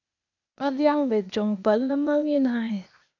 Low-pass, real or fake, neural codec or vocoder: 7.2 kHz; fake; codec, 16 kHz, 0.8 kbps, ZipCodec